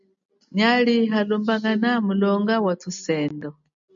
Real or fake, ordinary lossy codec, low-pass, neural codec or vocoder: real; AAC, 64 kbps; 7.2 kHz; none